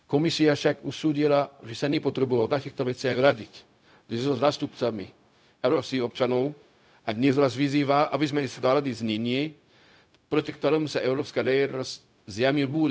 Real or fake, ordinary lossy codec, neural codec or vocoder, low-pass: fake; none; codec, 16 kHz, 0.4 kbps, LongCat-Audio-Codec; none